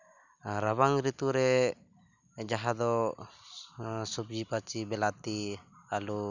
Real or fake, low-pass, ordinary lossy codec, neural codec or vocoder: real; 7.2 kHz; none; none